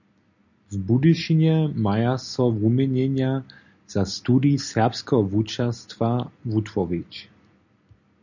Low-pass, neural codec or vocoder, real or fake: 7.2 kHz; none; real